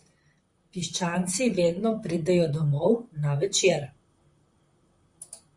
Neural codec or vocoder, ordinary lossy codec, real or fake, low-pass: vocoder, 44.1 kHz, 128 mel bands, Pupu-Vocoder; Opus, 64 kbps; fake; 10.8 kHz